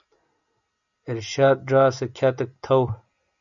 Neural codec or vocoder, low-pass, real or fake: none; 7.2 kHz; real